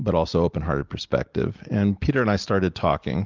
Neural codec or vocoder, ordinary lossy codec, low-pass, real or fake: none; Opus, 24 kbps; 7.2 kHz; real